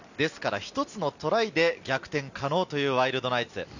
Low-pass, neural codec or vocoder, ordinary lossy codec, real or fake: 7.2 kHz; none; none; real